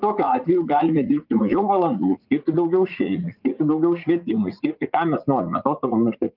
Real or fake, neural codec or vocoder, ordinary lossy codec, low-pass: fake; vocoder, 44.1 kHz, 80 mel bands, Vocos; Opus, 24 kbps; 5.4 kHz